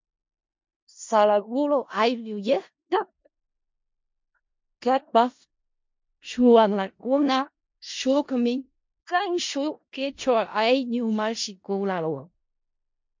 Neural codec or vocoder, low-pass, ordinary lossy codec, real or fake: codec, 16 kHz in and 24 kHz out, 0.4 kbps, LongCat-Audio-Codec, four codebook decoder; 7.2 kHz; MP3, 48 kbps; fake